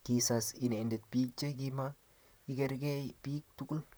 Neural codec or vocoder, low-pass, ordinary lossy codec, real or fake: vocoder, 44.1 kHz, 128 mel bands, Pupu-Vocoder; none; none; fake